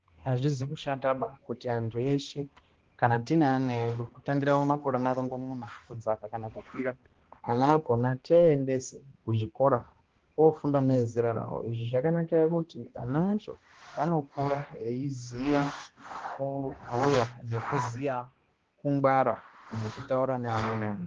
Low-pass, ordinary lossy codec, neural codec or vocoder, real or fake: 7.2 kHz; Opus, 16 kbps; codec, 16 kHz, 1 kbps, X-Codec, HuBERT features, trained on balanced general audio; fake